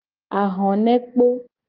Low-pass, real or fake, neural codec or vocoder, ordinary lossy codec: 5.4 kHz; real; none; Opus, 32 kbps